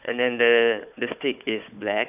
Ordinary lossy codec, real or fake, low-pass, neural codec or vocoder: none; fake; 3.6 kHz; codec, 16 kHz, 4 kbps, FunCodec, trained on Chinese and English, 50 frames a second